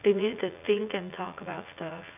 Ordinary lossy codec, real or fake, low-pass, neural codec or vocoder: none; fake; 3.6 kHz; vocoder, 44.1 kHz, 80 mel bands, Vocos